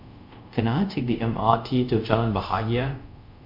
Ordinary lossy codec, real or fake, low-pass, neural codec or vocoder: MP3, 48 kbps; fake; 5.4 kHz; codec, 24 kHz, 0.5 kbps, DualCodec